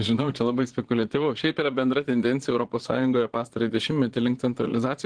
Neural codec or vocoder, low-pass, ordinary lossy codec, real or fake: vocoder, 22.05 kHz, 80 mel bands, Vocos; 9.9 kHz; Opus, 16 kbps; fake